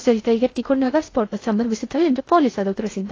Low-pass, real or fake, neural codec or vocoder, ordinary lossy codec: 7.2 kHz; fake; codec, 16 kHz in and 24 kHz out, 0.6 kbps, FocalCodec, streaming, 2048 codes; AAC, 32 kbps